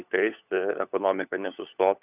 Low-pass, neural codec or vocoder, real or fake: 3.6 kHz; codec, 16 kHz, 4 kbps, FunCodec, trained on LibriTTS, 50 frames a second; fake